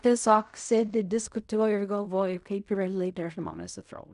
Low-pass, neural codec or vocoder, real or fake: 10.8 kHz; codec, 16 kHz in and 24 kHz out, 0.4 kbps, LongCat-Audio-Codec, fine tuned four codebook decoder; fake